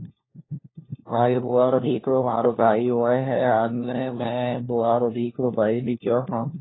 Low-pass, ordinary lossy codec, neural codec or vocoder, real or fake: 7.2 kHz; AAC, 16 kbps; codec, 16 kHz, 1 kbps, FunCodec, trained on LibriTTS, 50 frames a second; fake